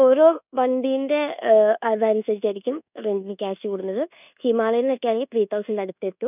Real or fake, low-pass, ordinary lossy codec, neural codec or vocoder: fake; 3.6 kHz; none; codec, 24 kHz, 1.2 kbps, DualCodec